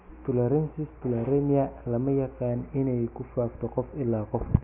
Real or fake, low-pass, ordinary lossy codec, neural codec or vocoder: real; 3.6 kHz; none; none